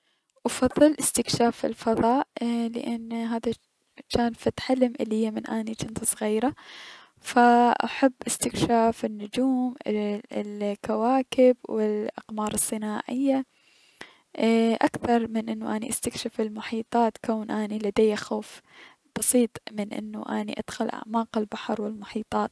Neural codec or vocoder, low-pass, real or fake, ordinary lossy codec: none; none; real; none